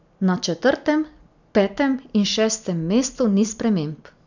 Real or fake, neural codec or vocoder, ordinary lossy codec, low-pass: real; none; none; 7.2 kHz